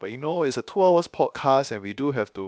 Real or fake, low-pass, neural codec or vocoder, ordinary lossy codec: fake; none; codec, 16 kHz, about 1 kbps, DyCAST, with the encoder's durations; none